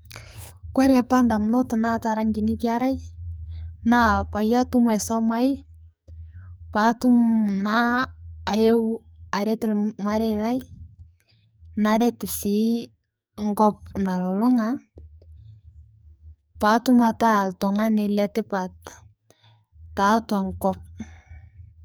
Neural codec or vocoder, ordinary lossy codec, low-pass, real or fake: codec, 44.1 kHz, 2.6 kbps, SNAC; none; none; fake